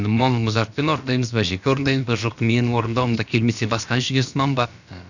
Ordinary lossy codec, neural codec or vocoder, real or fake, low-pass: none; codec, 16 kHz, about 1 kbps, DyCAST, with the encoder's durations; fake; 7.2 kHz